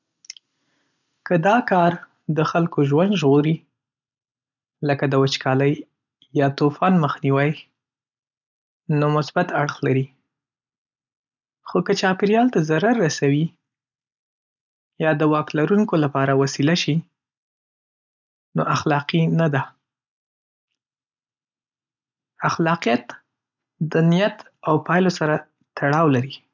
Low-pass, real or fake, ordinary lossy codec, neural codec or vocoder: 7.2 kHz; real; none; none